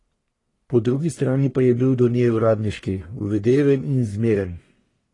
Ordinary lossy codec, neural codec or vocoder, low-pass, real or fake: AAC, 32 kbps; codec, 44.1 kHz, 1.7 kbps, Pupu-Codec; 10.8 kHz; fake